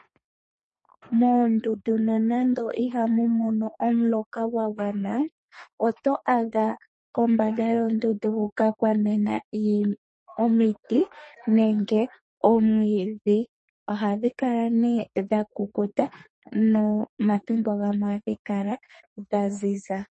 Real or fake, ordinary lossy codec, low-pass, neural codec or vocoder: fake; MP3, 32 kbps; 10.8 kHz; codec, 32 kHz, 1.9 kbps, SNAC